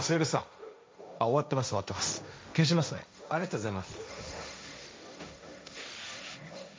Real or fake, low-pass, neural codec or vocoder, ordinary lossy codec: fake; none; codec, 16 kHz, 1.1 kbps, Voila-Tokenizer; none